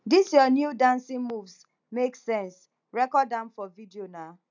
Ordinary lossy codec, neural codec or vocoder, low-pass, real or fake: none; none; 7.2 kHz; real